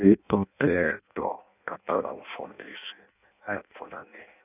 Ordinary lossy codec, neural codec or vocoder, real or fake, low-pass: none; codec, 16 kHz in and 24 kHz out, 0.6 kbps, FireRedTTS-2 codec; fake; 3.6 kHz